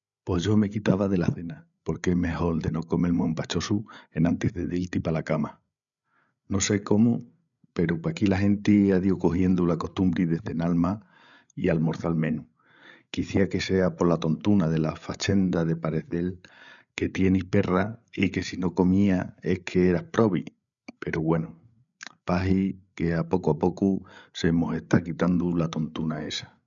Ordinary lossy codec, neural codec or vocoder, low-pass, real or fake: none; codec, 16 kHz, 8 kbps, FreqCodec, larger model; 7.2 kHz; fake